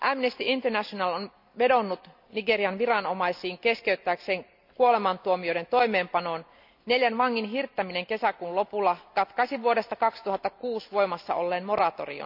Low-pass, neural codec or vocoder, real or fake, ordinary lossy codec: 5.4 kHz; none; real; none